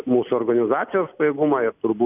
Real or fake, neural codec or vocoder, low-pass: real; none; 3.6 kHz